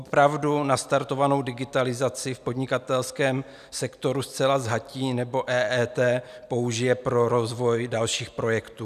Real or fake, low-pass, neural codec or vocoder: real; 14.4 kHz; none